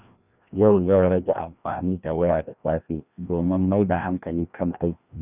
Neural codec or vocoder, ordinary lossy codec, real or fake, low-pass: codec, 16 kHz, 1 kbps, FreqCodec, larger model; none; fake; 3.6 kHz